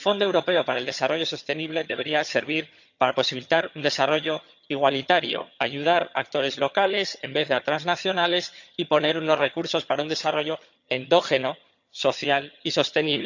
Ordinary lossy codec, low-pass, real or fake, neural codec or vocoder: none; 7.2 kHz; fake; vocoder, 22.05 kHz, 80 mel bands, HiFi-GAN